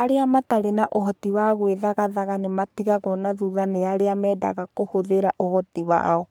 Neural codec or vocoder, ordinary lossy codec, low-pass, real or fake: codec, 44.1 kHz, 3.4 kbps, Pupu-Codec; none; none; fake